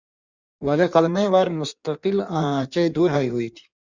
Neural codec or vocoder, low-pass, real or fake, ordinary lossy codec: codec, 16 kHz in and 24 kHz out, 1.1 kbps, FireRedTTS-2 codec; 7.2 kHz; fake; Opus, 64 kbps